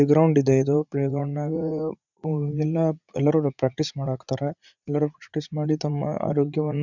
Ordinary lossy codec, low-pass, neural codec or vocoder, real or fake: none; 7.2 kHz; vocoder, 44.1 kHz, 80 mel bands, Vocos; fake